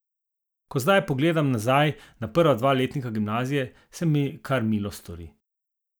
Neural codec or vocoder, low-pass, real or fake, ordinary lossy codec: none; none; real; none